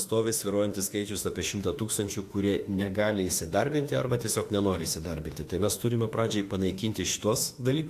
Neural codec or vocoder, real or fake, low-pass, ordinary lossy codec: autoencoder, 48 kHz, 32 numbers a frame, DAC-VAE, trained on Japanese speech; fake; 14.4 kHz; AAC, 64 kbps